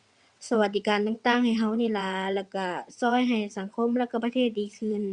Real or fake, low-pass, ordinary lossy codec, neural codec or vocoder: fake; 9.9 kHz; Opus, 64 kbps; vocoder, 22.05 kHz, 80 mel bands, WaveNeXt